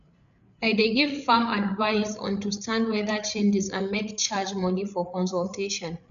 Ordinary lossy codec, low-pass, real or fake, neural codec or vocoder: none; 7.2 kHz; fake; codec, 16 kHz, 8 kbps, FreqCodec, larger model